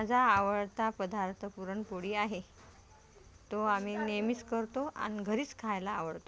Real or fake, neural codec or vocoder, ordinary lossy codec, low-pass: real; none; none; none